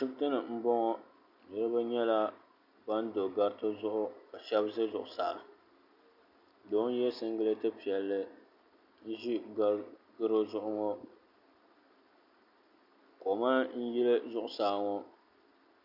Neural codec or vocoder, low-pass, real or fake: none; 5.4 kHz; real